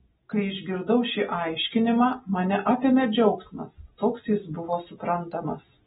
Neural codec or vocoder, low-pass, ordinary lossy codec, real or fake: none; 10.8 kHz; AAC, 16 kbps; real